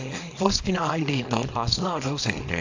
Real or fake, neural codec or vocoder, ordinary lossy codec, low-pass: fake; codec, 24 kHz, 0.9 kbps, WavTokenizer, small release; AAC, 48 kbps; 7.2 kHz